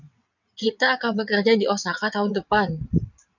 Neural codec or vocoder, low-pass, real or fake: vocoder, 22.05 kHz, 80 mel bands, WaveNeXt; 7.2 kHz; fake